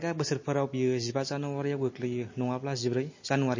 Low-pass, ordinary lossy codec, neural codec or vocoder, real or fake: 7.2 kHz; MP3, 32 kbps; none; real